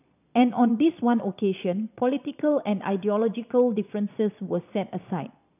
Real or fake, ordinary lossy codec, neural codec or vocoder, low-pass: fake; none; vocoder, 22.05 kHz, 80 mel bands, Vocos; 3.6 kHz